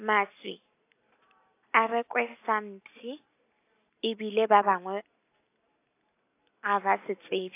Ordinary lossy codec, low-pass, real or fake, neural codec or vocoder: AAC, 24 kbps; 3.6 kHz; real; none